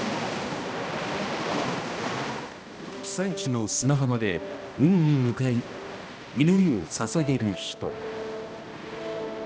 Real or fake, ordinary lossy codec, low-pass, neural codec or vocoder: fake; none; none; codec, 16 kHz, 1 kbps, X-Codec, HuBERT features, trained on balanced general audio